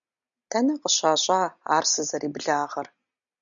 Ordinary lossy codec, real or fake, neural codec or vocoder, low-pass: MP3, 96 kbps; real; none; 7.2 kHz